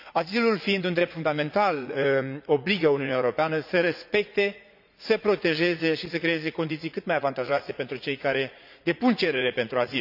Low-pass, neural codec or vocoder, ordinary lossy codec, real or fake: 5.4 kHz; vocoder, 44.1 kHz, 80 mel bands, Vocos; none; fake